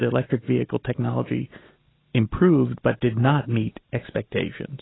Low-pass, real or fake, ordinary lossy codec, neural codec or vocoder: 7.2 kHz; real; AAC, 16 kbps; none